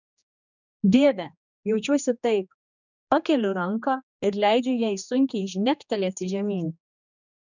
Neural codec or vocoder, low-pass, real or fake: codec, 16 kHz, 2 kbps, X-Codec, HuBERT features, trained on general audio; 7.2 kHz; fake